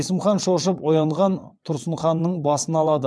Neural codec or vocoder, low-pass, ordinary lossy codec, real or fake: vocoder, 22.05 kHz, 80 mel bands, WaveNeXt; none; none; fake